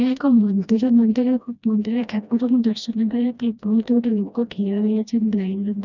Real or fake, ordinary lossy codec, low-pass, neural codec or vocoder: fake; none; 7.2 kHz; codec, 16 kHz, 1 kbps, FreqCodec, smaller model